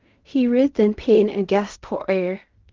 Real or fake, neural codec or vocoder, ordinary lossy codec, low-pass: fake; codec, 16 kHz in and 24 kHz out, 0.4 kbps, LongCat-Audio-Codec, fine tuned four codebook decoder; Opus, 24 kbps; 7.2 kHz